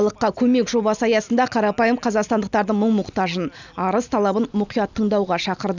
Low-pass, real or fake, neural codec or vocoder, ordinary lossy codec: 7.2 kHz; real; none; none